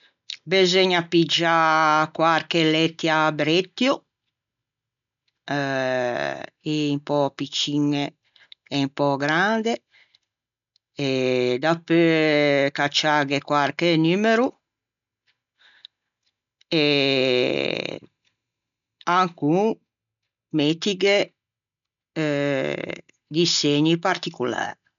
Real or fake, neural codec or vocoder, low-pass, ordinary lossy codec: real; none; 7.2 kHz; none